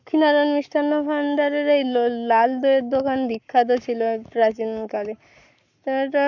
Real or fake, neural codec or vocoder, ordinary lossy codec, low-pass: fake; codec, 44.1 kHz, 7.8 kbps, Pupu-Codec; none; 7.2 kHz